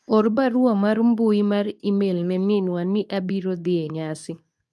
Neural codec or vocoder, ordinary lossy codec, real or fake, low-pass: codec, 24 kHz, 0.9 kbps, WavTokenizer, medium speech release version 2; none; fake; none